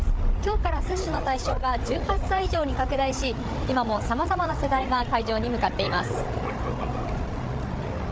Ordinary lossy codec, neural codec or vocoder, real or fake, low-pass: none; codec, 16 kHz, 8 kbps, FreqCodec, larger model; fake; none